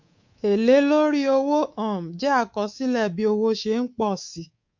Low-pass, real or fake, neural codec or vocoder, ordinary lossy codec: 7.2 kHz; fake; codec, 24 kHz, 3.1 kbps, DualCodec; MP3, 48 kbps